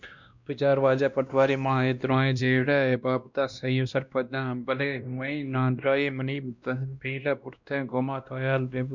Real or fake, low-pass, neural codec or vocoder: fake; 7.2 kHz; codec, 16 kHz, 1 kbps, X-Codec, WavLM features, trained on Multilingual LibriSpeech